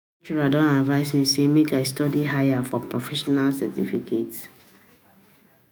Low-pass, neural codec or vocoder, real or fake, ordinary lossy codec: none; autoencoder, 48 kHz, 128 numbers a frame, DAC-VAE, trained on Japanese speech; fake; none